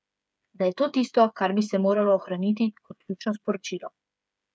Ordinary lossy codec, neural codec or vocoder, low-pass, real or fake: none; codec, 16 kHz, 8 kbps, FreqCodec, smaller model; none; fake